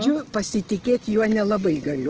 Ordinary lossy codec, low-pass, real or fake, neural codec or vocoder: Opus, 16 kbps; 7.2 kHz; real; none